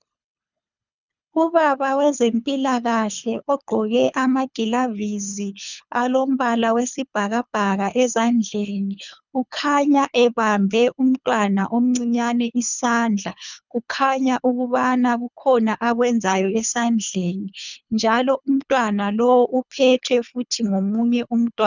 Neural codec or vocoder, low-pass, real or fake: codec, 24 kHz, 3 kbps, HILCodec; 7.2 kHz; fake